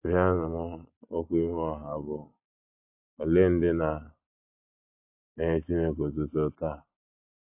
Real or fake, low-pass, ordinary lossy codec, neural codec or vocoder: real; 3.6 kHz; AAC, 32 kbps; none